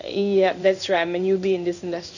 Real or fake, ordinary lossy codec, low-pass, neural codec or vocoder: fake; none; 7.2 kHz; codec, 16 kHz in and 24 kHz out, 1 kbps, XY-Tokenizer